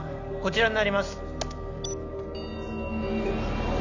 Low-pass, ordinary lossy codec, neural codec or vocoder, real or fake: 7.2 kHz; none; none; real